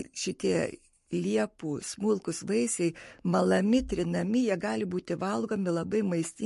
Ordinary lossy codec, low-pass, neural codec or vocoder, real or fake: MP3, 48 kbps; 14.4 kHz; codec, 44.1 kHz, 7.8 kbps, Pupu-Codec; fake